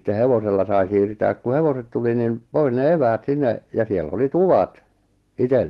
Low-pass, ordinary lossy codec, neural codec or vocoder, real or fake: 14.4 kHz; Opus, 16 kbps; none; real